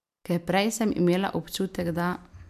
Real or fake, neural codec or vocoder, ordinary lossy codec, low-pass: real; none; MP3, 96 kbps; 14.4 kHz